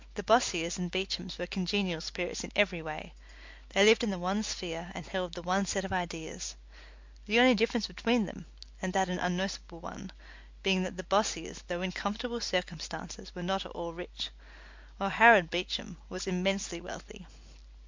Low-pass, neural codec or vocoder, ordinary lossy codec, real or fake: 7.2 kHz; none; MP3, 64 kbps; real